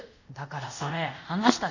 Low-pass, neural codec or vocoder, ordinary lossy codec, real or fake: 7.2 kHz; codec, 24 kHz, 0.5 kbps, DualCodec; none; fake